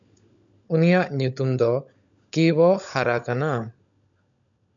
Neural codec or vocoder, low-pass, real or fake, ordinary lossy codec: codec, 16 kHz, 16 kbps, FunCodec, trained on LibriTTS, 50 frames a second; 7.2 kHz; fake; MP3, 96 kbps